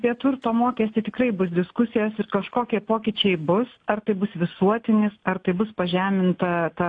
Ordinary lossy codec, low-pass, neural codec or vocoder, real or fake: AAC, 48 kbps; 9.9 kHz; none; real